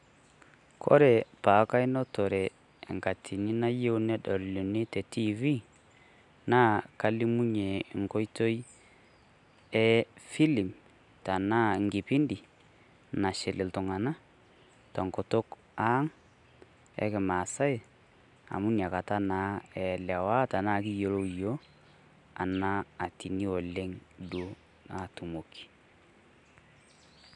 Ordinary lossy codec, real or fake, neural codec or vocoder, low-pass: none; real; none; 10.8 kHz